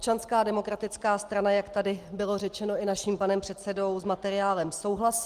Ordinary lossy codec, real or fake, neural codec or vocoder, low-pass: Opus, 24 kbps; real; none; 14.4 kHz